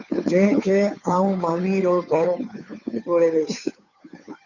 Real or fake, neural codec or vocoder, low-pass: fake; codec, 16 kHz, 2 kbps, FunCodec, trained on Chinese and English, 25 frames a second; 7.2 kHz